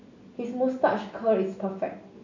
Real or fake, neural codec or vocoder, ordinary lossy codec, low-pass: real; none; none; 7.2 kHz